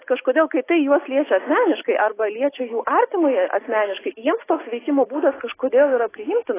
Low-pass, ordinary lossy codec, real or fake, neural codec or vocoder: 3.6 kHz; AAC, 16 kbps; real; none